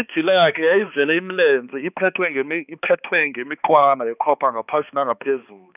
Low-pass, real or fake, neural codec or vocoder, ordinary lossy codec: 3.6 kHz; fake; codec, 16 kHz, 2 kbps, X-Codec, HuBERT features, trained on balanced general audio; none